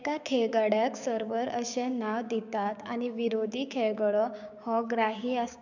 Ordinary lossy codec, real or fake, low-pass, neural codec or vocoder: none; fake; 7.2 kHz; codec, 16 kHz, 6 kbps, DAC